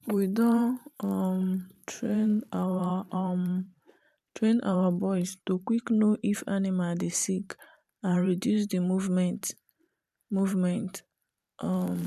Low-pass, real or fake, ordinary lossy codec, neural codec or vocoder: 14.4 kHz; fake; none; vocoder, 44.1 kHz, 128 mel bands every 512 samples, BigVGAN v2